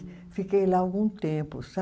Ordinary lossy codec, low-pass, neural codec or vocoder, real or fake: none; none; none; real